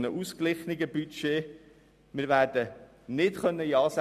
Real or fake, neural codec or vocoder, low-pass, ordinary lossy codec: real; none; 14.4 kHz; none